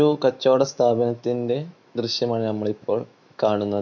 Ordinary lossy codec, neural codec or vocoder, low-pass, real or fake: none; autoencoder, 48 kHz, 128 numbers a frame, DAC-VAE, trained on Japanese speech; 7.2 kHz; fake